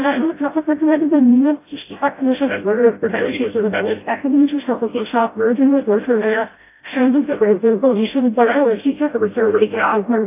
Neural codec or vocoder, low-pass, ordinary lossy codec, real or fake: codec, 16 kHz, 0.5 kbps, FreqCodec, smaller model; 3.6 kHz; AAC, 24 kbps; fake